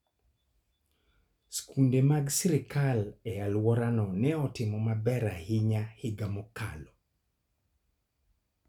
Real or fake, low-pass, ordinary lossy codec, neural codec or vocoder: real; 19.8 kHz; none; none